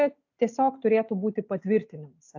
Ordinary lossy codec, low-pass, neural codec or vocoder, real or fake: AAC, 48 kbps; 7.2 kHz; none; real